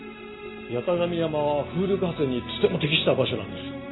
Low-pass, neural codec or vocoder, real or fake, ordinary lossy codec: 7.2 kHz; none; real; AAC, 16 kbps